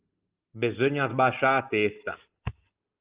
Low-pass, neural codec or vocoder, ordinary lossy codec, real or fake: 3.6 kHz; none; Opus, 24 kbps; real